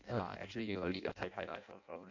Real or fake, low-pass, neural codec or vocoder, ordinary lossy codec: fake; 7.2 kHz; codec, 16 kHz in and 24 kHz out, 0.6 kbps, FireRedTTS-2 codec; none